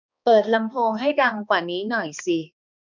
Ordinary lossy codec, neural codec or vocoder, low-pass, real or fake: none; codec, 16 kHz, 2 kbps, X-Codec, HuBERT features, trained on balanced general audio; 7.2 kHz; fake